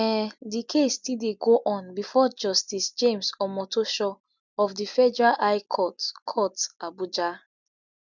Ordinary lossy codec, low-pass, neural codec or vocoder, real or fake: none; 7.2 kHz; none; real